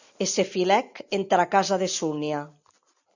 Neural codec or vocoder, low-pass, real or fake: none; 7.2 kHz; real